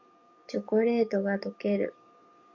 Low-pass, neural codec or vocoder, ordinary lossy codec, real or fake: 7.2 kHz; codec, 44.1 kHz, 7.8 kbps, DAC; Opus, 64 kbps; fake